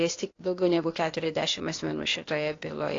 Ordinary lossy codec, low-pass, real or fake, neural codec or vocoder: AAC, 32 kbps; 7.2 kHz; fake; codec, 16 kHz, 0.8 kbps, ZipCodec